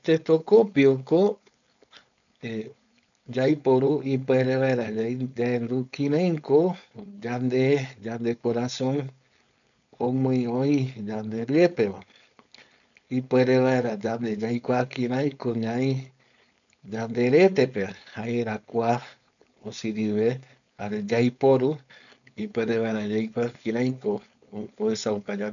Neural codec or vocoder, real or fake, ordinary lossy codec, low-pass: codec, 16 kHz, 4.8 kbps, FACodec; fake; none; 7.2 kHz